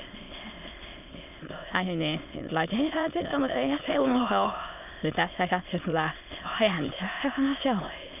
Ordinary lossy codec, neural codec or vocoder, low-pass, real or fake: none; autoencoder, 22.05 kHz, a latent of 192 numbers a frame, VITS, trained on many speakers; 3.6 kHz; fake